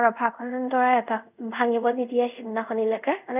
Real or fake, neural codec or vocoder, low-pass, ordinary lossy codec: fake; codec, 24 kHz, 0.5 kbps, DualCodec; 3.6 kHz; none